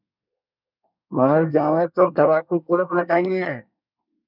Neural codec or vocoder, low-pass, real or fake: codec, 24 kHz, 1 kbps, SNAC; 5.4 kHz; fake